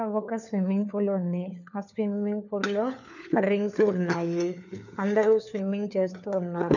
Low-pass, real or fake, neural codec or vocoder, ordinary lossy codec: 7.2 kHz; fake; codec, 16 kHz, 4 kbps, FunCodec, trained on LibriTTS, 50 frames a second; none